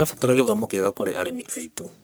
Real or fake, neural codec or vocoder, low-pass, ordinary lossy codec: fake; codec, 44.1 kHz, 1.7 kbps, Pupu-Codec; none; none